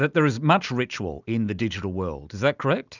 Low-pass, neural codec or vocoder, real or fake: 7.2 kHz; none; real